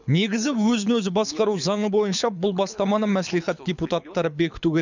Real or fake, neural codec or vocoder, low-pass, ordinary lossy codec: fake; codec, 24 kHz, 6 kbps, HILCodec; 7.2 kHz; none